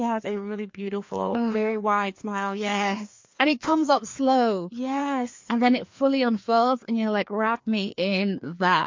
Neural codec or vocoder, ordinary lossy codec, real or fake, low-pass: codec, 16 kHz, 2 kbps, FreqCodec, larger model; MP3, 48 kbps; fake; 7.2 kHz